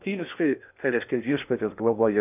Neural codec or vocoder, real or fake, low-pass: codec, 16 kHz in and 24 kHz out, 0.6 kbps, FocalCodec, streaming, 2048 codes; fake; 3.6 kHz